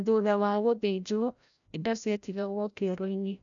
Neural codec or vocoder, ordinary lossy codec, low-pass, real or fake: codec, 16 kHz, 0.5 kbps, FreqCodec, larger model; none; 7.2 kHz; fake